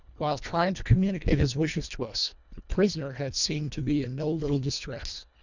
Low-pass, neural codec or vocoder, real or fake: 7.2 kHz; codec, 24 kHz, 1.5 kbps, HILCodec; fake